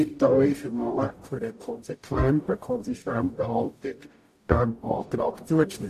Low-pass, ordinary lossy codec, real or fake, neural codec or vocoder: 14.4 kHz; AAC, 64 kbps; fake; codec, 44.1 kHz, 0.9 kbps, DAC